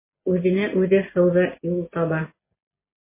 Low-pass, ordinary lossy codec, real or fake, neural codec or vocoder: 3.6 kHz; MP3, 16 kbps; real; none